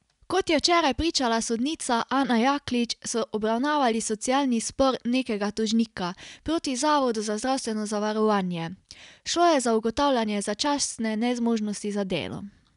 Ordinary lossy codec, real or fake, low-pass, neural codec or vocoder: none; real; 10.8 kHz; none